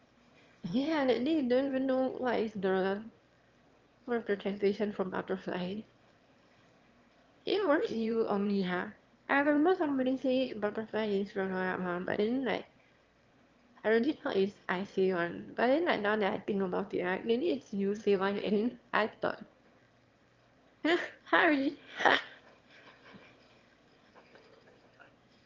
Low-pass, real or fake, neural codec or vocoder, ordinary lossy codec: 7.2 kHz; fake; autoencoder, 22.05 kHz, a latent of 192 numbers a frame, VITS, trained on one speaker; Opus, 32 kbps